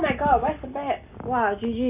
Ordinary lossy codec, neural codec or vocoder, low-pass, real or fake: none; none; 3.6 kHz; real